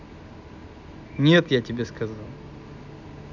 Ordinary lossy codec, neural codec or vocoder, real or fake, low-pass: none; none; real; 7.2 kHz